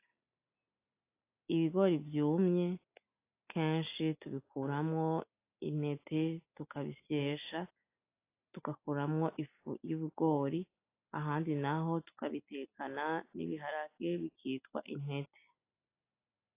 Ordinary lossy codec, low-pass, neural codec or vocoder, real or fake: AAC, 24 kbps; 3.6 kHz; none; real